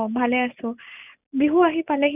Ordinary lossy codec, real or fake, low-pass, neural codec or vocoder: none; real; 3.6 kHz; none